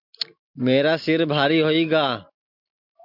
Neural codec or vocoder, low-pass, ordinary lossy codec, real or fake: none; 5.4 kHz; MP3, 48 kbps; real